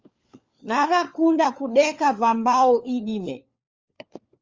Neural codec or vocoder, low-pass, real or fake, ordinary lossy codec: codec, 16 kHz, 4 kbps, FunCodec, trained on LibriTTS, 50 frames a second; 7.2 kHz; fake; Opus, 32 kbps